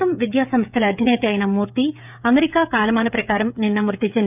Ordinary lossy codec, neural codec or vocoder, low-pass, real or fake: none; codec, 16 kHz, 16 kbps, FreqCodec, smaller model; 3.6 kHz; fake